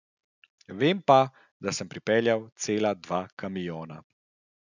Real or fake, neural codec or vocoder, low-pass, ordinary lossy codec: real; none; 7.2 kHz; none